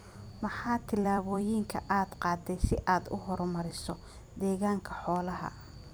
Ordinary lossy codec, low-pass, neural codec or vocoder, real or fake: none; none; vocoder, 44.1 kHz, 128 mel bands every 256 samples, BigVGAN v2; fake